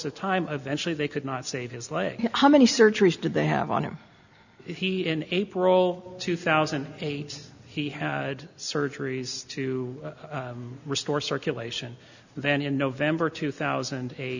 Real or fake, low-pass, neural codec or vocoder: real; 7.2 kHz; none